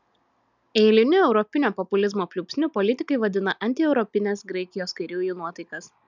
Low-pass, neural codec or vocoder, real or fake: 7.2 kHz; none; real